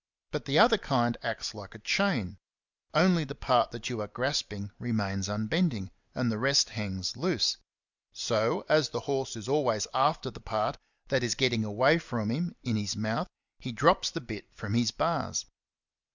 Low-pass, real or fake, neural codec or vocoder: 7.2 kHz; real; none